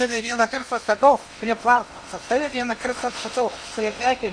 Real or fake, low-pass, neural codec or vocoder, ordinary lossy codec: fake; 9.9 kHz; codec, 16 kHz in and 24 kHz out, 0.8 kbps, FocalCodec, streaming, 65536 codes; Opus, 64 kbps